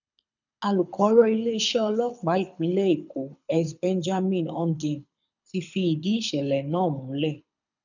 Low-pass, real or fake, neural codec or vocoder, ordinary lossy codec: 7.2 kHz; fake; codec, 24 kHz, 6 kbps, HILCodec; none